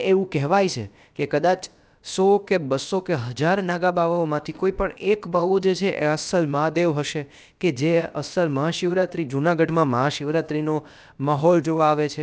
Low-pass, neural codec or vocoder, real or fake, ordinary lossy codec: none; codec, 16 kHz, about 1 kbps, DyCAST, with the encoder's durations; fake; none